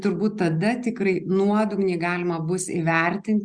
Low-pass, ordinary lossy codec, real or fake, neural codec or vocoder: 9.9 kHz; Opus, 64 kbps; real; none